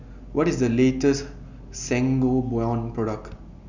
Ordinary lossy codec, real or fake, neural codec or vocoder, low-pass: none; real; none; 7.2 kHz